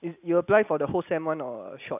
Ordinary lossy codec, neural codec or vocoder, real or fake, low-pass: none; none; real; 3.6 kHz